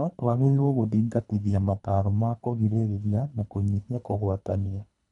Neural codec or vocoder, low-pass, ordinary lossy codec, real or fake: codec, 24 kHz, 3 kbps, HILCodec; 10.8 kHz; none; fake